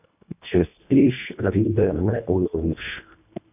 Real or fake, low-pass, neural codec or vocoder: fake; 3.6 kHz; codec, 24 kHz, 1.5 kbps, HILCodec